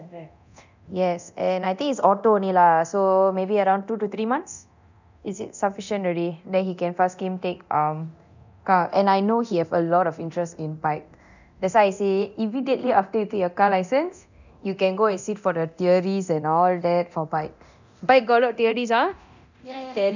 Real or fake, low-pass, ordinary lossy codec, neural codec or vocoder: fake; 7.2 kHz; none; codec, 24 kHz, 0.9 kbps, DualCodec